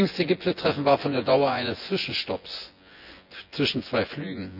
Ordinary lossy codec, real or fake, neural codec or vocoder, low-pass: none; fake; vocoder, 24 kHz, 100 mel bands, Vocos; 5.4 kHz